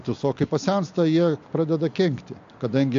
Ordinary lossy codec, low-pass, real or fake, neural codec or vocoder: AAC, 64 kbps; 7.2 kHz; real; none